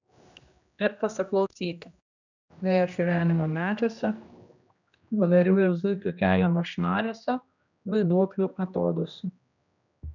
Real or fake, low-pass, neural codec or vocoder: fake; 7.2 kHz; codec, 16 kHz, 1 kbps, X-Codec, HuBERT features, trained on general audio